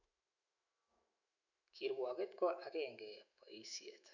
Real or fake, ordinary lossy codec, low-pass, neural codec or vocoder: fake; none; 7.2 kHz; autoencoder, 48 kHz, 128 numbers a frame, DAC-VAE, trained on Japanese speech